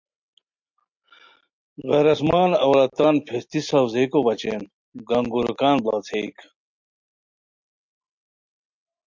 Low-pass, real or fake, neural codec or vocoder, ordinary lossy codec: 7.2 kHz; real; none; MP3, 48 kbps